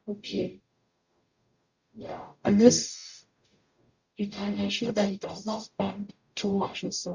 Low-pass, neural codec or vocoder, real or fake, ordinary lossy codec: 7.2 kHz; codec, 44.1 kHz, 0.9 kbps, DAC; fake; Opus, 64 kbps